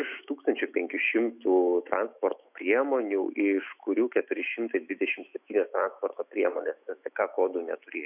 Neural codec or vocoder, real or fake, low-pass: autoencoder, 48 kHz, 128 numbers a frame, DAC-VAE, trained on Japanese speech; fake; 3.6 kHz